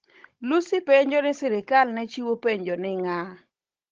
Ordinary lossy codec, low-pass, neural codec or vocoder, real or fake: Opus, 16 kbps; 7.2 kHz; codec, 16 kHz, 16 kbps, FunCodec, trained on Chinese and English, 50 frames a second; fake